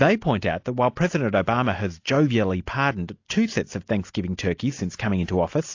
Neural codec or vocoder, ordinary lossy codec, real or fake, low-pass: none; AAC, 48 kbps; real; 7.2 kHz